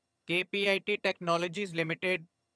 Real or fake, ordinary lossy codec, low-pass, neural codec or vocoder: fake; none; none; vocoder, 22.05 kHz, 80 mel bands, HiFi-GAN